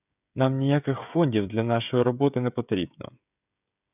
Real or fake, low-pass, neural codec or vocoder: fake; 3.6 kHz; codec, 16 kHz, 16 kbps, FreqCodec, smaller model